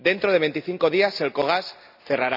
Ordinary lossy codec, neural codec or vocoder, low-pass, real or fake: none; none; 5.4 kHz; real